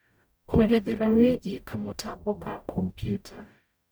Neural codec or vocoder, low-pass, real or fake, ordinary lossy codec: codec, 44.1 kHz, 0.9 kbps, DAC; none; fake; none